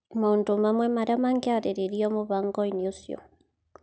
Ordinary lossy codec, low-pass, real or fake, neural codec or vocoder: none; none; real; none